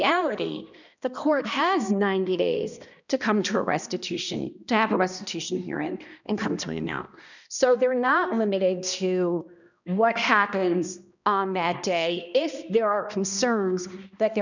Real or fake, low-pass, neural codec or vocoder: fake; 7.2 kHz; codec, 16 kHz, 1 kbps, X-Codec, HuBERT features, trained on balanced general audio